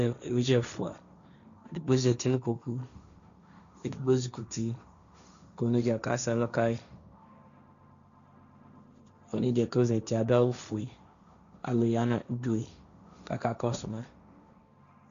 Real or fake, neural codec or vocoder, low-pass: fake; codec, 16 kHz, 1.1 kbps, Voila-Tokenizer; 7.2 kHz